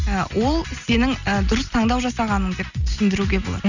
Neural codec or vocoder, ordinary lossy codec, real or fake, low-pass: none; none; real; 7.2 kHz